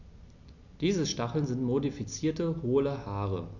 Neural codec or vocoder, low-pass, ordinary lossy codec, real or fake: none; 7.2 kHz; none; real